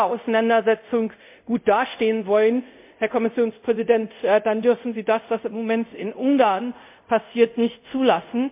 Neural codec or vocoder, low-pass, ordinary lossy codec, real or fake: codec, 24 kHz, 0.5 kbps, DualCodec; 3.6 kHz; MP3, 32 kbps; fake